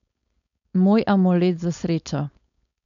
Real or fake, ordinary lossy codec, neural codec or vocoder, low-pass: fake; MP3, 96 kbps; codec, 16 kHz, 4.8 kbps, FACodec; 7.2 kHz